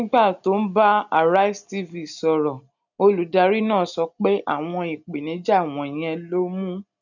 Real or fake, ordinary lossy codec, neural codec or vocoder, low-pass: real; none; none; 7.2 kHz